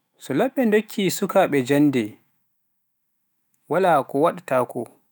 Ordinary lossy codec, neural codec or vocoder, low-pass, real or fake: none; autoencoder, 48 kHz, 128 numbers a frame, DAC-VAE, trained on Japanese speech; none; fake